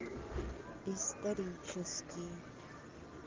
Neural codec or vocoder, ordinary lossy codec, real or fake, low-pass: none; Opus, 24 kbps; real; 7.2 kHz